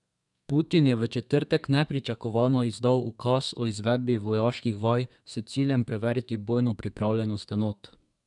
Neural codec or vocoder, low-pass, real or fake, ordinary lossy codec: codec, 32 kHz, 1.9 kbps, SNAC; 10.8 kHz; fake; none